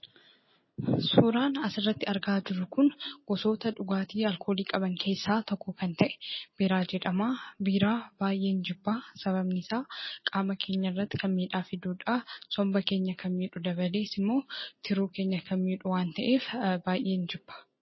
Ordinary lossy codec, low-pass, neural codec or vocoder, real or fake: MP3, 24 kbps; 7.2 kHz; none; real